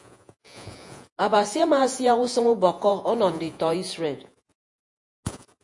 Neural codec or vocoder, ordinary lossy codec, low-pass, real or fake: vocoder, 48 kHz, 128 mel bands, Vocos; AAC, 64 kbps; 10.8 kHz; fake